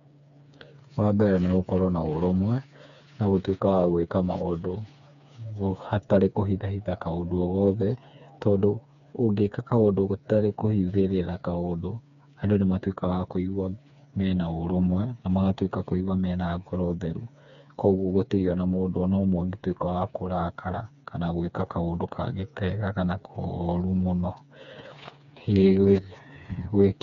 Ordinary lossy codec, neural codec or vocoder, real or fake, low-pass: none; codec, 16 kHz, 4 kbps, FreqCodec, smaller model; fake; 7.2 kHz